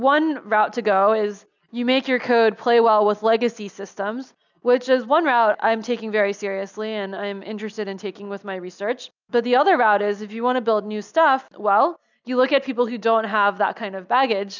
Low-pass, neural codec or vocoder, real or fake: 7.2 kHz; none; real